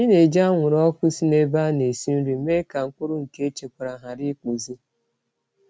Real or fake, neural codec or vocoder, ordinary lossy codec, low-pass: real; none; none; none